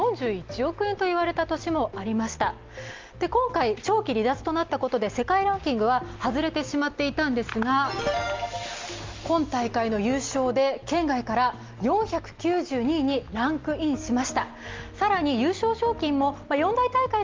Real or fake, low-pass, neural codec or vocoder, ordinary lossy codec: real; 7.2 kHz; none; Opus, 32 kbps